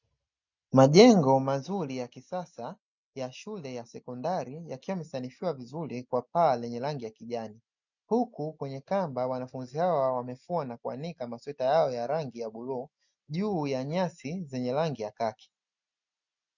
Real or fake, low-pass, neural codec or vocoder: real; 7.2 kHz; none